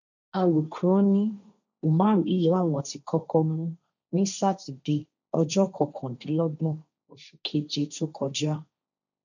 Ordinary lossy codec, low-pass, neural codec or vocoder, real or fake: none; 7.2 kHz; codec, 16 kHz, 1.1 kbps, Voila-Tokenizer; fake